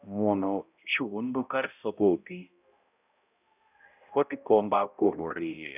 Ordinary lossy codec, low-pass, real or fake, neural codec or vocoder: none; 3.6 kHz; fake; codec, 16 kHz, 0.5 kbps, X-Codec, HuBERT features, trained on balanced general audio